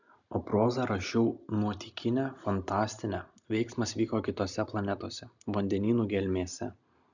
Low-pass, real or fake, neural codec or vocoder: 7.2 kHz; real; none